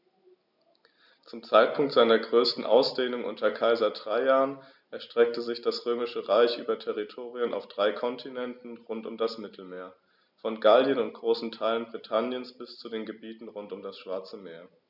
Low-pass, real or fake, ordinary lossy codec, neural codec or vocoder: 5.4 kHz; real; none; none